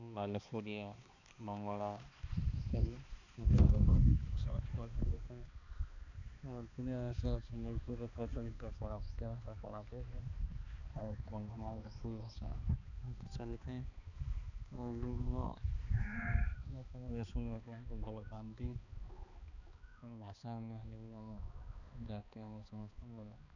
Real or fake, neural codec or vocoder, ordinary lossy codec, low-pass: fake; codec, 16 kHz, 2 kbps, X-Codec, HuBERT features, trained on balanced general audio; none; 7.2 kHz